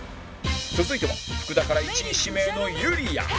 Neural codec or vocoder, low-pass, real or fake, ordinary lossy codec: none; none; real; none